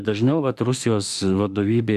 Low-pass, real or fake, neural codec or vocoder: 14.4 kHz; fake; autoencoder, 48 kHz, 32 numbers a frame, DAC-VAE, trained on Japanese speech